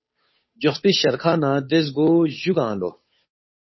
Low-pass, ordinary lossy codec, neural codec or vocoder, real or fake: 7.2 kHz; MP3, 24 kbps; codec, 16 kHz, 8 kbps, FunCodec, trained on Chinese and English, 25 frames a second; fake